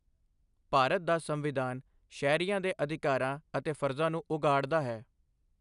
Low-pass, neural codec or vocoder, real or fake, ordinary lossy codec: 10.8 kHz; none; real; none